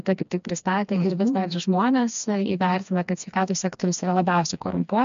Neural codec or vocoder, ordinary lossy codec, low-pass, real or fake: codec, 16 kHz, 2 kbps, FreqCodec, smaller model; MP3, 64 kbps; 7.2 kHz; fake